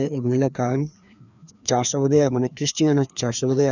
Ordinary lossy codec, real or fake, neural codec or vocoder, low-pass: none; fake; codec, 16 kHz, 2 kbps, FreqCodec, larger model; 7.2 kHz